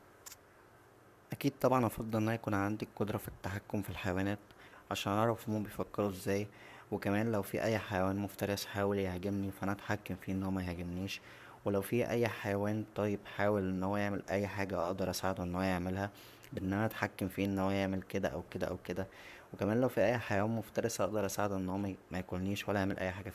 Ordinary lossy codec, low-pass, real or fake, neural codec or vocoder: none; 14.4 kHz; fake; codec, 44.1 kHz, 7.8 kbps, Pupu-Codec